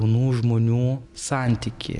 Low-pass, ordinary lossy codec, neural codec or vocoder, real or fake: 10.8 kHz; MP3, 96 kbps; none; real